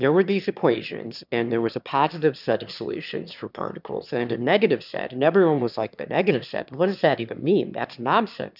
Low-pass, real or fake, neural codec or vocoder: 5.4 kHz; fake; autoencoder, 22.05 kHz, a latent of 192 numbers a frame, VITS, trained on one speaker